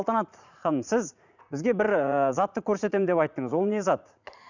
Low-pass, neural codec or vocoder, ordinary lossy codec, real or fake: 7.2 kHz; vocoder, 44.1 kHz, 80 mel bands, Vocos; none; fake